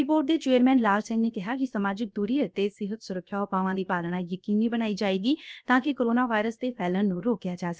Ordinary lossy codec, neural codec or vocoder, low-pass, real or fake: none; codec, 16 kHz, 0.7 kbps, FocalCodec; none; fake